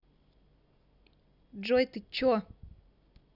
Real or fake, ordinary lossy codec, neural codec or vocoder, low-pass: real; none; none; 5.4 kHz